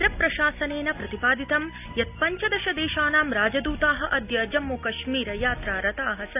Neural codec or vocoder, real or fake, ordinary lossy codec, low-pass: none; real; AAC, 32 kbps; 3.6 kHz